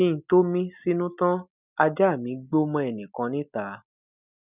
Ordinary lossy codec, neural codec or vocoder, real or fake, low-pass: none; none; real; 3.6 kHz